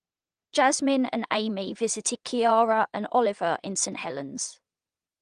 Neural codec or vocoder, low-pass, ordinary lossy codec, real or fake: vocoder, 22.05 kHz, 80 mel bands, WaveNeXt; 9.9 kHz; Opus, 24 kbps; fake